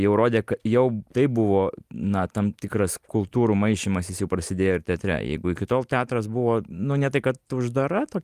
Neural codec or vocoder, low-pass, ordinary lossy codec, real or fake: none; 14.4 kHz; Opus, 32 kbps; real